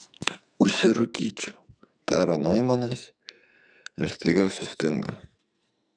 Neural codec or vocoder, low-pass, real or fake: codec, 44.1 kHz, 2.6 kbps, SNAC; 9.9 kHz; fake